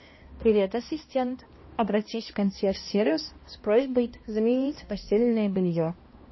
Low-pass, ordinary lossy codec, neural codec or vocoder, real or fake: 7.2 kHz; MP3, 24 kbps; codec, 16 kHz, 1 kbps, X-Codec, HuBERT features, trained on balanced general audio; fake